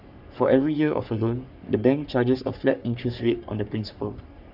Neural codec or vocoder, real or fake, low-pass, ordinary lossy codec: codec, 44.1 kHz, 3.4 kbps, Pupu-Codec; fake; 5.4 kHz; none